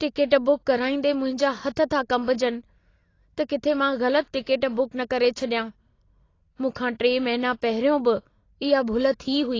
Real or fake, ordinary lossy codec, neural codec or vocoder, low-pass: real; AAC, 32 kbps; none; 7.2 kHz